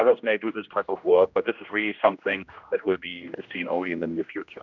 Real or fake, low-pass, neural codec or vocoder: fake; 7.2 kHz; codec, 16 kHz, 1 kbps, X-Codec, HuBERT features, trained on general audio